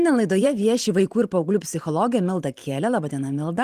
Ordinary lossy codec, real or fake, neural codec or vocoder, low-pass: Opus, 24 kbps; fake; vocoder, 44.1 kHz, 128 mel bands every 512 samples, BigVGAN v2; 14.4 kHz